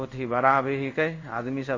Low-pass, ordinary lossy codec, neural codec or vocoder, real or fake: 7.2 kHz; MP3, 32 kbps; codec, 16 kHz in and 24 kHz out, 1 kbps, XY-Tokenizer; fake